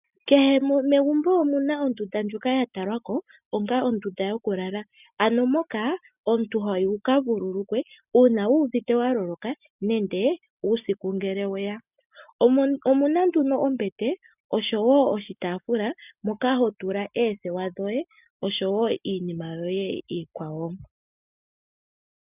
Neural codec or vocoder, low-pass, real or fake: none; 3.6 kHz; real